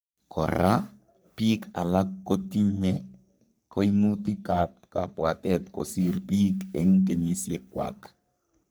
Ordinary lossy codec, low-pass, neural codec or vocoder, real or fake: none; none; codec, 44.1 kHz, 3.4 kbps, Pupu-Codec; fake